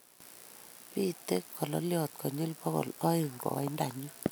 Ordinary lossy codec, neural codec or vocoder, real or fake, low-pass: none; none; real; none